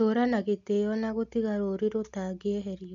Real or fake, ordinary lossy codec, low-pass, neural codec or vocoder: real; none; 7.2 kHz; none